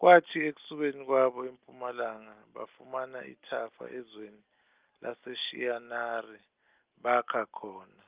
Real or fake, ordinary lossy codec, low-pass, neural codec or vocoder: real; Opus, 32 kbps; 3.6 kHz; none